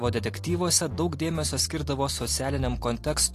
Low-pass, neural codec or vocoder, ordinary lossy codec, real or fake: 14.4 kHz; none; AAC, 48 kbps; real